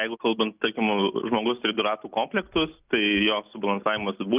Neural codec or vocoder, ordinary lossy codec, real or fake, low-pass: none; Opus, 24 kbps; real; 3.6 kHz